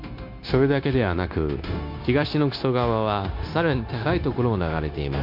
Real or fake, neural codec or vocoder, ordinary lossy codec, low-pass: fake; codec, 16 kHz, 0.9 kbps, LongCat-Audio-Codec; MP3, 48 kbps; 5.4 kHz